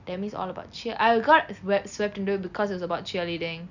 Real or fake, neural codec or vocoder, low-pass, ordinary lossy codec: real; none; 7.2 kHz; none